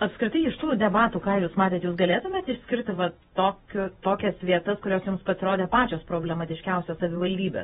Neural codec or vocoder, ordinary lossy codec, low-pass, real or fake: vocoder, 48 kHz, 128 mel bands, Vocos; AAC, 16 kbps; 19.8 kHz; fake